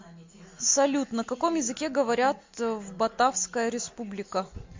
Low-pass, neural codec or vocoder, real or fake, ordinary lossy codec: 7.2 kHz; none; real; MP3, 48 kbps